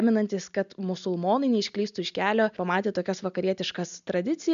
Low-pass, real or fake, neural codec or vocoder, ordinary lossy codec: 7.2 kHz; real; none; AAC, 96 kbps